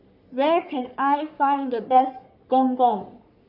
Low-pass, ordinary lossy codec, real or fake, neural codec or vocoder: 5.4 kHz; none; fake; codec, 44.1 kHz, 3.4 kbps, Pupu-Codec